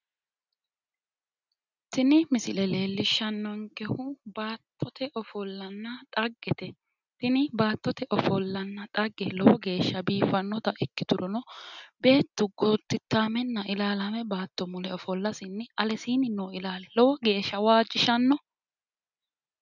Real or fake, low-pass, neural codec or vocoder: real; 7.2 kHz; none